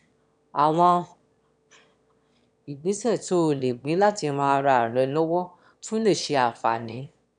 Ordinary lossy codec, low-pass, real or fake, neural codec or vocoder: none; 9.9 kHz; fake; autoencoder, 22.05 kHz, a latent of 192 numbers a frame, VITS, trained on one speaker